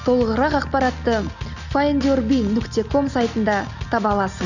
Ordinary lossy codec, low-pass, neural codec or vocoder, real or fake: none; 7.2 kHz; none; real